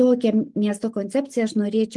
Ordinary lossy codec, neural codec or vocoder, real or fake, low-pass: Opus, 24 kbps; none; real; 10.8 kHz